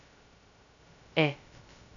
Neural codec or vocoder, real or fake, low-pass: codec, 16 kHz, 0.2 kbps, FocalCodec; fake; 7.2 kHz